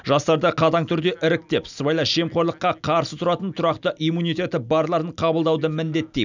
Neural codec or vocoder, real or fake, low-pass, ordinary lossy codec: none; real; 7.2 kHz; none